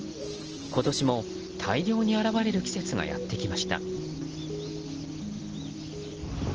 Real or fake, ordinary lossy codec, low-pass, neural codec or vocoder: real; Opus, 16 kbps; 7.2 kHz; none